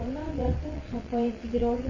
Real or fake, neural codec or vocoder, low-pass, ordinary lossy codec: fake; vocoder, 22.05 kHz, 80 mel bands, WaveNeXt; 7.2 kHz; none